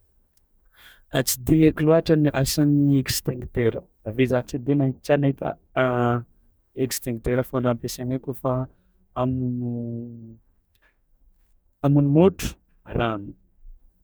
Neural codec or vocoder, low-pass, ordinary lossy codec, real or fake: codec, 44.1 kHz, 2.6 kbps, DAC; none; none; fake